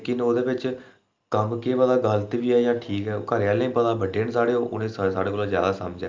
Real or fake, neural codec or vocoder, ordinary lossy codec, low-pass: real; none; Opus, 24 kbps; 7.2 kHz